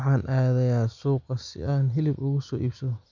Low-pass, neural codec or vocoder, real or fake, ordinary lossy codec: 7.2 kHz; none; real; none